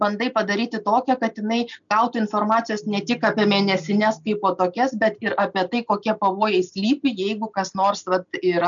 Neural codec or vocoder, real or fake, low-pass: none; real; 7.2 kHz